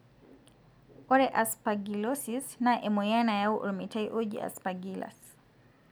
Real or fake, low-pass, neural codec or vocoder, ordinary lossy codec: real; none; none; none